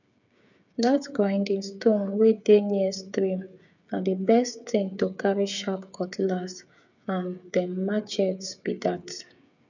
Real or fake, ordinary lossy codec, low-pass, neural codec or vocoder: fake; none; 7.2 kHz; codec, 16 kHz, 8 kbps, FreqCodec, smaller model